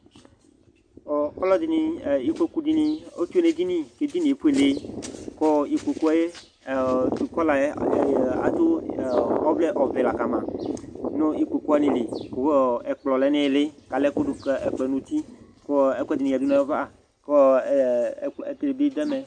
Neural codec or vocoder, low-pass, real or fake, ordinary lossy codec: none; 9.9 kHz; real; AAC, 64 kbps